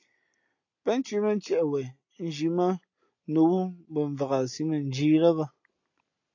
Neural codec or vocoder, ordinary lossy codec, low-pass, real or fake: none; AAC, 48 kbps; 7.2 kHz; real